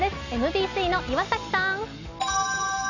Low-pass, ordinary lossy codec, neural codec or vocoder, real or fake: 7.2 kHz; none; none; real